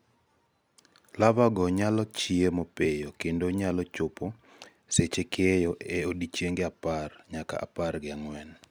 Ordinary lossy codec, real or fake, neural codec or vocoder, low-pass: none; real; none; none